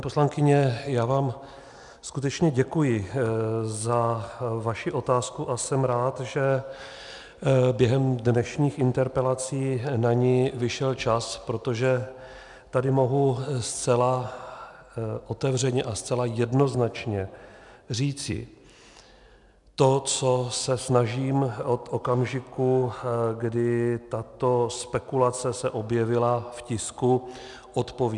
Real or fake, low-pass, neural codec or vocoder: real; 10.8 kHz; none